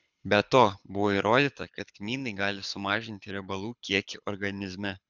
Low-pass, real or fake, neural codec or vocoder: 7.2 kHz; fake; codec, 24 kHz, 6 kbps, HILCodec